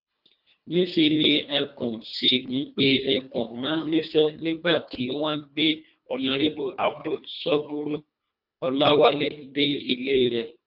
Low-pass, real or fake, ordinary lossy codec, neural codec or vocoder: 5.4 kHz; fake; none; codec, 24 kHz, 1.5 kbps, HILCodec